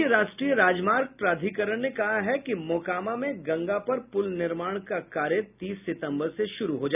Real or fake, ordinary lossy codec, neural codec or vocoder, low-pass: real; none; none; 3.6 kHz